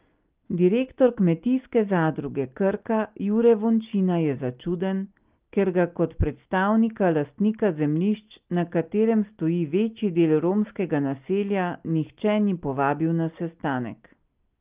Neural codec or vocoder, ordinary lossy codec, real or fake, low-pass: none; Opus, 32 kbps; real; 3.6 kHz